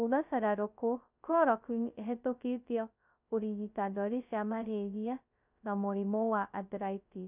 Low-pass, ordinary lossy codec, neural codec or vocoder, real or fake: 3.6 kHz; none; codec, 16 kHz, 0.2 kbps, FocalCodec; fake